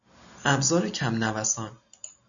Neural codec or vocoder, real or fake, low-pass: none; real; 7.2 kHz